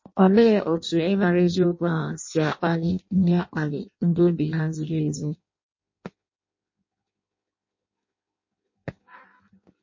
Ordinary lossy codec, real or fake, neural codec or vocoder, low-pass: MP3, 32 kbps; fake; codec, 16 kHz in and 24 kHz out, 0.6 kbps, FireRedTTS-2 codec; 7.2 kHz